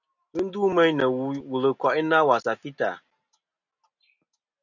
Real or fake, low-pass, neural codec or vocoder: real; 7.2 kHz; none